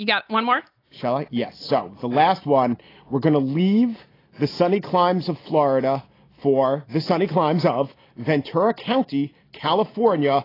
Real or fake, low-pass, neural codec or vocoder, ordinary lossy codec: real; 5.4 kHz; none; AAC, 24 kbps